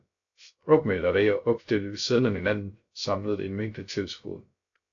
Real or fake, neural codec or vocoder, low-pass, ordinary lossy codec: fake; codec, 16 kHz, 0.3 kbps, FocalCodec; 7.2 kHz; AAC, 48 kbps